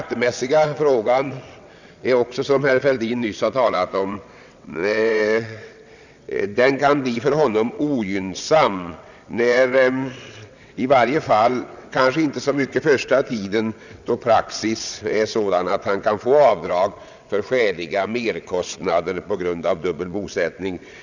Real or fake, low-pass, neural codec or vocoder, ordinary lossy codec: fake; 7.2 kHz; vocoder, 22.05 kHz, 80 mel bands, WaveNeXt; none